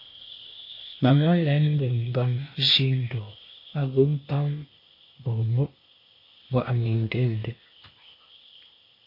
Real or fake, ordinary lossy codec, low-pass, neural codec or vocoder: fake; MP3, 32 kbps; 5.4 kHz; codec, 16 kHz, 0.8 kbps, ZipCodec